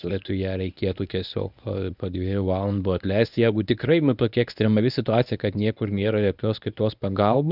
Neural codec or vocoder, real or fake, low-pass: codec, 24 kHz, 0.9 kbps, WavTokenizer, medium speech release version 1; fake; 5.4 kHz